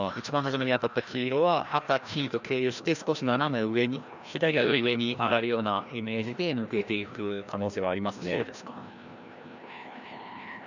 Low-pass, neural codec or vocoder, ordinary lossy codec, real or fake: 7.2 kHz; codec, 16 kHz, 1 kbps, FreqCodec, larger model; none; fake